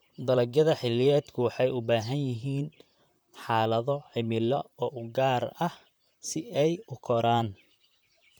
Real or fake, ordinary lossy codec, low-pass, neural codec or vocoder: fake; none; none; vocoder, 44.1 kHz, 128 mel bands, Pupu-Vocoder